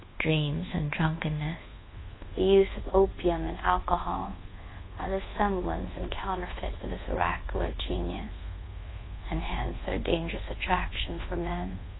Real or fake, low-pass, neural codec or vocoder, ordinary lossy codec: fake; 7.2 kHz; codec, 24 kHz, 1.2 kbps, DualCodec; AAC, 16 kbps